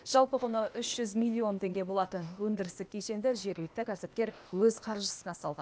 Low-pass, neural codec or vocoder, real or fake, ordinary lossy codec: none; codec, 16 kHz, 0.8 kbps, ZipCodec; fake; none